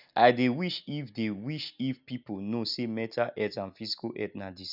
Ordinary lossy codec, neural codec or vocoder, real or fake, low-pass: none; none; real; 5.4 kHz